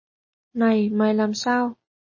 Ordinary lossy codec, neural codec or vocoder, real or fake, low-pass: MP3, 32 kbps; none; real; 7.2 kHz